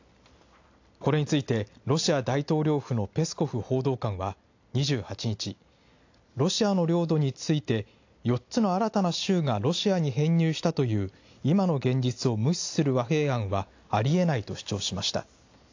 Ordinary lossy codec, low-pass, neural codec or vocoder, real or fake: AAC, 48 kbps; 7.2 kHz; none; real